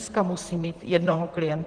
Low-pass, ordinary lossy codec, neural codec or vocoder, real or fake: 9.9 kHz; Opus, 16 kbps; vocoder, 44.1 kHz, 128 mel bands, Pupu-Vocoder; fake